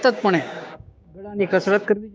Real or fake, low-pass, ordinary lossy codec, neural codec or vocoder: fake; none; none; codec, 16 kHz, 6 kbps, DAC